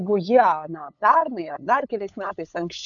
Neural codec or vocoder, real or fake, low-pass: codec, 16 kHz, 8 kbps, FunCodec, trained on LibriTTS, 25 frames a second; fake; 7.2 kHz